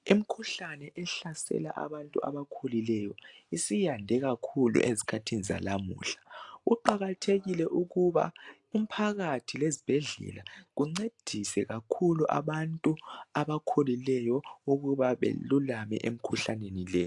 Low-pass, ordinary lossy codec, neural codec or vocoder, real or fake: 10.8 kHz; AAC, 64 kbps; none; real